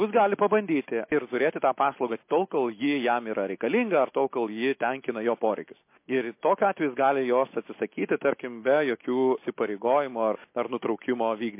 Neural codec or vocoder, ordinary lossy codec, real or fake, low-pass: none; MP3, 24 kbps; real; 3.6 kHz